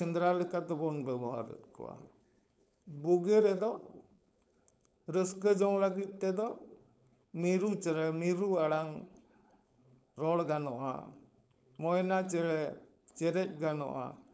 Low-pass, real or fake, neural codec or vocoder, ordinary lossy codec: none; fake; codec, 16 kHz, 4.8 kbps, FACodec; none